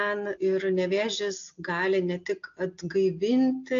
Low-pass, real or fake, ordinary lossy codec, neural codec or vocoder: 7.2 kHz; real; AAC, 64 kbps; none